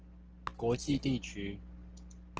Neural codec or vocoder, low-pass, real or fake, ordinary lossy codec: none; 7.2 kHz; real; Opus, 16 kbps